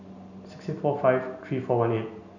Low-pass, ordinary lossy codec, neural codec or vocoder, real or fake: 7.2 kHz; none; none; real